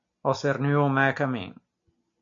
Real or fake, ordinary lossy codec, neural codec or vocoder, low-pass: real; MP3, 64 kbps; none; 7.2 kHz